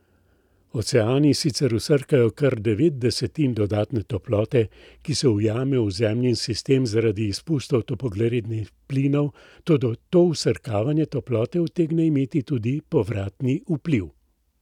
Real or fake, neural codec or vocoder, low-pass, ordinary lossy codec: real; none; 19.8 kHz; none